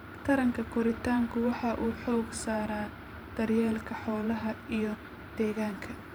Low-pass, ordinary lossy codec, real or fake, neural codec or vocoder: none; none; fake; vocoder, 44.1 kHz, 128 mel bands every 512 samples, BigVGAN v2